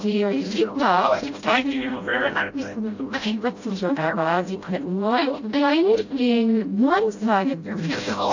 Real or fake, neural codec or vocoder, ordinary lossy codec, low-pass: fake; codec, 16 kHz, 0.5 kbps, FreqCodec, smaller model; AAC, 48 kbps; 7.2 kHz